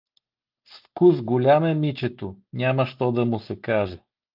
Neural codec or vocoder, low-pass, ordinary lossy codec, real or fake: none; 5.4 kHz; Opus, 24 kbps; real